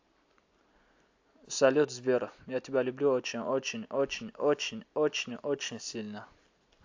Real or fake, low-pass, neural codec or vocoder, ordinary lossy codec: real; 7.2 kHz; none; AAC, 48 kbps